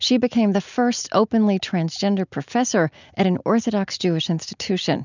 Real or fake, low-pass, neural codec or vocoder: real; 7.2 kHz; none